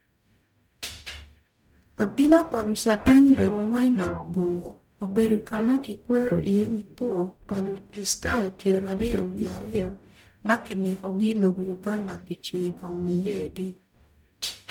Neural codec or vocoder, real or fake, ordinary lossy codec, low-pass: codec, 44.1 kHz, 0.9 kbps, DAC; fake; none; 19.8 kHz